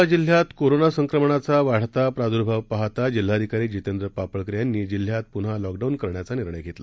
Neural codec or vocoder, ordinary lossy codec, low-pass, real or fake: none; none; none; real